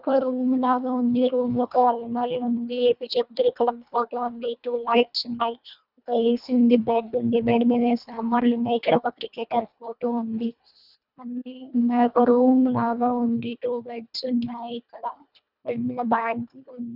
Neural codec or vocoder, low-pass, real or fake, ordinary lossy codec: codec, 24 kHz, 1.5 kbps, HILCodec; 5.4 kHz; fake; none